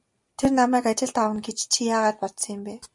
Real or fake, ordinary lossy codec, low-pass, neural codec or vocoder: real; MP3, 96 kbps; 10.8 kHz; none